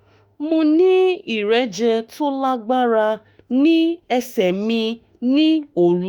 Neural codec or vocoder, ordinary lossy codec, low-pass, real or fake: autoencoder, 48 kHz, 32 numbers a frame, DAC-VAE, trained on Japanese speech; Opus, 64 kbps; 19.8 kHz; fake